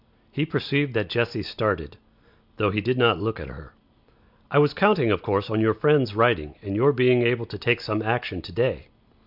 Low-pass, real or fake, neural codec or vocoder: 5.4 kHz; real; none